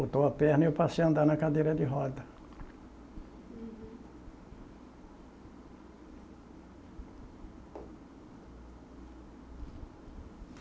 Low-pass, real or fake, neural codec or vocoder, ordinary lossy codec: none; real; none; none